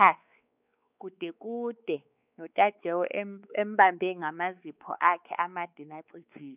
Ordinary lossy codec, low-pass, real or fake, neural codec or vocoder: none; 3.6 kHz; fake; codec, 16 kHz, 4 kbps, X-Codec, WavLM features, trained on Multilingual LibriSpeech